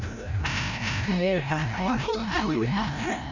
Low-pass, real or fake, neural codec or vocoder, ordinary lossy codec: 7.2 kHz; fake; codec, 16 kHz, 0.5 kbps, FreqCodec, larger model; none